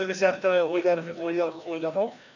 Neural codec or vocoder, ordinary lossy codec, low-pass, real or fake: codec, 16 kHz, 1 kbps, FreqCodec, larger model; none; 7.2 kHz; fake